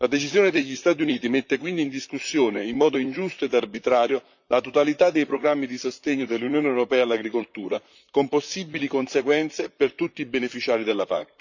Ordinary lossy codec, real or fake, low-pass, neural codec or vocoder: none; fake; 7.2 kHz; vocoder, 44.1 kHz, 128 mel bands, Pupu-Vocoder